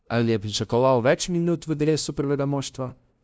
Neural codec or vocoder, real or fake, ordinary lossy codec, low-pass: codec, 16 kHz, 0.5 kbps, FunCodec, trained on LibriTTS, 25 frames a second; fake; none; none